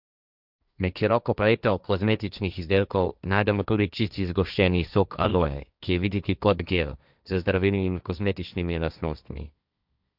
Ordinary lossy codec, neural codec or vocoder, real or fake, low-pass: none; codec, 16 kHz, 1.1 kbps, Voila-Tokenizer; fake; 5.4 kHz